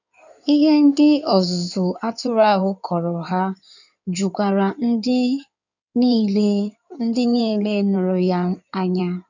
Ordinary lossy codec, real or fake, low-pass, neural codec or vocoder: none; fake; 7.2 kHz; codec, 16 kHz in and 24 kHz out, 2.2 kbps, FireRedTTS-2 codec